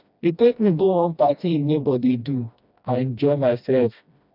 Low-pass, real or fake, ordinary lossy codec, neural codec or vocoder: 5.4 kHz; fake; none; codec, 16 kHz, 1 kbps, FreqCodec, smaller model